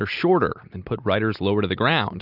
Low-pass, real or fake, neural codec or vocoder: 5.4 kHz; real; none